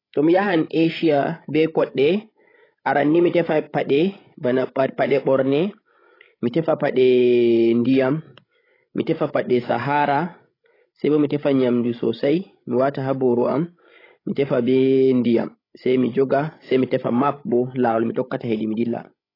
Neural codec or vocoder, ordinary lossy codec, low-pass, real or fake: codec, 16 kHz, 16 kbps, FreqCodec, larger model; AAC, 24 kbps; 5.4 kHz; fake